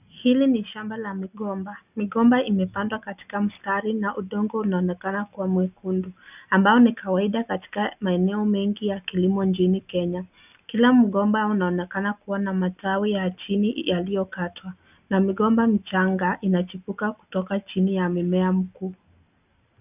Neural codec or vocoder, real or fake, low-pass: none; real; 3.6 kHz